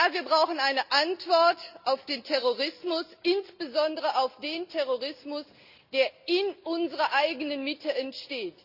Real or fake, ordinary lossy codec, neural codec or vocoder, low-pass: real; Opus, 64 kbps; none; 5.4 kHz